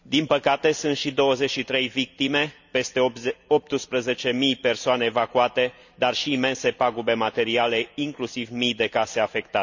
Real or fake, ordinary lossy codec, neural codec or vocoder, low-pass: real; none; none; 7.2 kHz